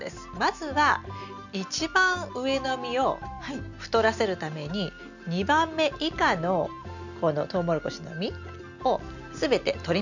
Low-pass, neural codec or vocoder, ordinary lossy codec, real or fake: 7.2 kHz; none; none; real